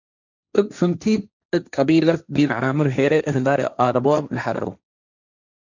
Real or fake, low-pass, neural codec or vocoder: fake; 7.2 kHz; codec, 16 kHz, 1.1 kbps, Voila-Tokenizer